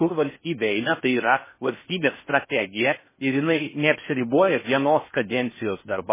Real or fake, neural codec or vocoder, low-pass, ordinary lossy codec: fake; codec, 16 kHz in and 24 kHz out, 0.6 kbps, FocalCodec, streaming, 4096 codes; 3.6 kHz; MP3, 16 kbps